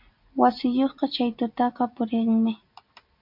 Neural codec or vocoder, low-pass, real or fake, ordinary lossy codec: none; 5.4 kHz; real; MP3, 48 kbps